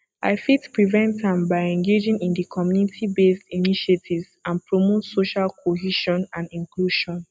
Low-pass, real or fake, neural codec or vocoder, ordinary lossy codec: none; real; none; none